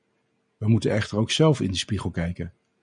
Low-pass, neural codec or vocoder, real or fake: 9.9 kHz; none; real